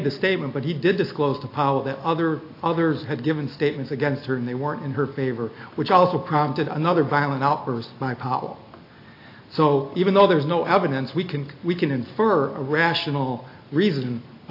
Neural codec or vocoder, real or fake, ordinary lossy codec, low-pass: none; real; AAC, 32 kbps; 5.4 kHz